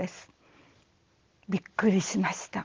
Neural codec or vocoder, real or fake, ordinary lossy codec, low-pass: none; real; Opus, 16 kbps; 7.2 kHz